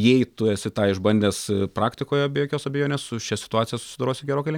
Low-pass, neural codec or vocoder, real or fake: 19.8 kHz; none; real